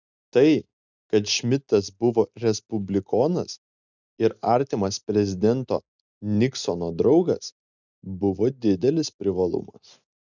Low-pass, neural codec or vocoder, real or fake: 7.2 kHz; none; real